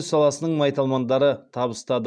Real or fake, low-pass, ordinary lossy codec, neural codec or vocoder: real; 9.9 kHz; none; none